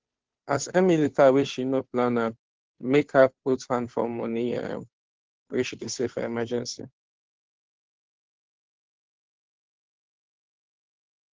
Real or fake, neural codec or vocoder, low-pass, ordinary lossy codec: fake; codec, 16 kHz, 2 kbps, FunCodec, trained on Chinese and English, 25 frames a second; 7.2 kHz; Opus, 16 kbps